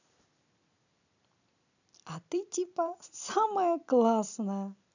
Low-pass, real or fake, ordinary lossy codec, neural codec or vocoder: 7.2 kHz; fake; none; vocoder, 44.1 kHz, 128 mel bands every 256 samples, BigVGAN v2